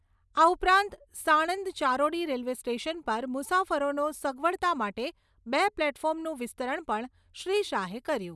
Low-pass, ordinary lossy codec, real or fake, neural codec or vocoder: none; none; real; none